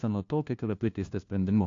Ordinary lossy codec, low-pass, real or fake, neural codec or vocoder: AAC, 48 kbps; 7.2 kHz; fake; codec, 16 kHz, 1 kbps, FunCodec, trained on LibriTTS, 50 frames a second